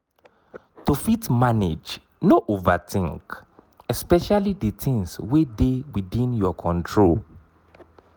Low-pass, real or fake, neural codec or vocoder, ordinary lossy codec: none; real; none; none